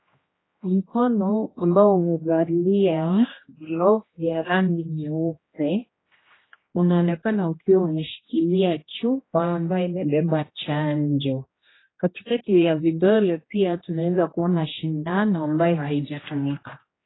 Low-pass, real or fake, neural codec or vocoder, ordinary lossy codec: 7.2 kHz; fake; codec, 16 kHz, 1 kbps, X-Codec, HuBERT features, trained on general audio; AAC, 16 kbps